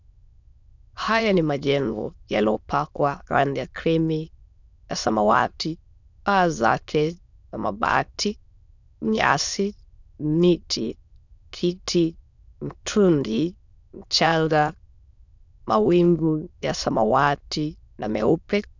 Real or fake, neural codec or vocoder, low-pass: fake; autoencoder, 22.05 kHz, a latent of 192 numbers a frame, VITS, trained on many speakers; 7.2 kHz